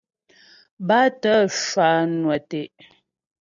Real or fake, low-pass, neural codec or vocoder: real; 7.2 kHz; none